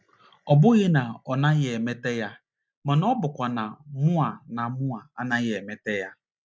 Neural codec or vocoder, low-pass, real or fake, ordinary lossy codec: none; none; real; none